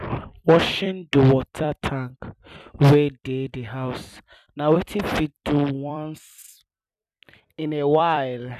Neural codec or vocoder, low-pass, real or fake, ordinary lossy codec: vocoder, 44.1 kHz, 128 mel bands every 256 samples, BigVGAN v2; 14.4 kHz; fake; none